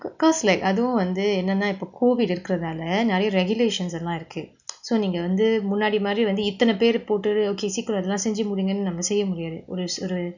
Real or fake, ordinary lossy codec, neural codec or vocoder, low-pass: real; none; none; 7.2 kHz